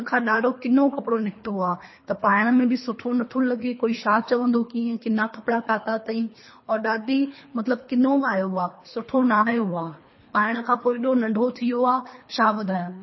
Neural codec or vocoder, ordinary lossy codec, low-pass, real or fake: codec, 24 kHz, 3 kbps, HILCodec; MP3, 24 kbps; 7.2 kHz; fake